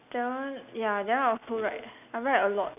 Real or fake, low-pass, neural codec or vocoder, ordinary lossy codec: real; 3.6 kHz; none; none